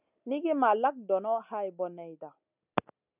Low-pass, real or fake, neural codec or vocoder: 3.6 kHz; real; none